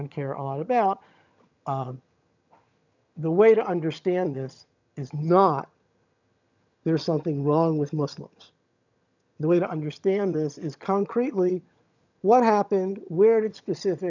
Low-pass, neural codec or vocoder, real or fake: 7.2 kHz; vocoder, 22.05 kHz, 80 mel bands, HiFi-GAN; fake